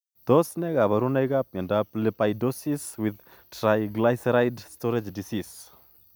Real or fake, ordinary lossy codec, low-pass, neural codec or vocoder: real; none; none; none